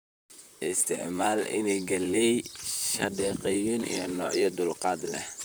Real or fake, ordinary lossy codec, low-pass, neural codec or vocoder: fake; none; none; vocoder, 44.1 kHz, 128 mel bands, Pupu-Vocoder